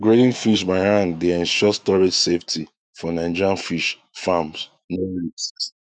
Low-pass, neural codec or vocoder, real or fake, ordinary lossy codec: 9.9 kHz; autoencoder, 48 kHz, 128 numbers a frame, DAC-VAE, trained on Japanese speech; fake; none